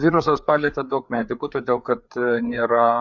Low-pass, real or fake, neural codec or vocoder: 7.2 kHz; fake; codec, 16 kHz, 4 kbps, FreqCodec, larger model